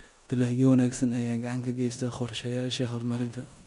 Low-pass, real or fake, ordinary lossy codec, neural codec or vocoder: 10.8 kHz; fake; none; codec, 16 kHz in and 24 kHz out, 0.9 kbps, LongCat-Audio-Codec, four codebook decoder